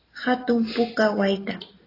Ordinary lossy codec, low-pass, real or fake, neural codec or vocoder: AAC, 24 kbps; 5.4 kHz; real; none